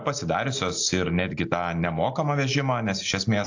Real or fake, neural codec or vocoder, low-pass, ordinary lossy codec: real; none; 7.2 kHz; AAC, 48 kbps